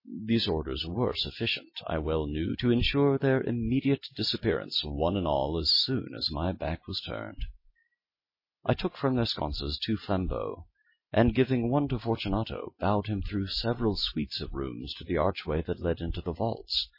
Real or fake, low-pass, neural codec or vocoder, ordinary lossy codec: real; 5.4 kHz; none; MP3, 24 kbps